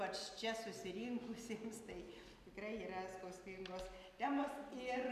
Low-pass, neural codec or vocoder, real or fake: 14.4 kHz; none; real